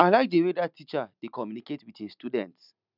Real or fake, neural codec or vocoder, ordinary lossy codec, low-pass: real; none; none; 5.4 kHz